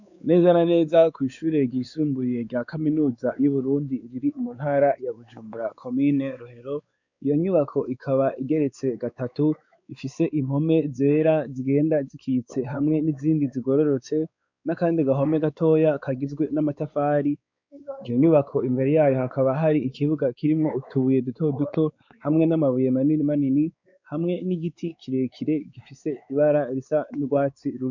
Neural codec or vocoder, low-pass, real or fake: codec, 16 kHz, 4 kbps, X-Codec, WavLM features, trained on Multilingual LibriSpeech; 7.2 kHz; fake